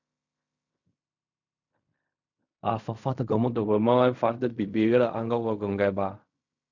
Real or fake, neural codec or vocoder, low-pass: fake; codec, 16 kHz in and 24 kHz out, 0.4 kbps, LongCat-Audio-Codec, fine tuned four codebook decoder; 7.2 kHz